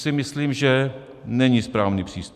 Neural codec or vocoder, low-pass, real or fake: none; 14.4 kHz; real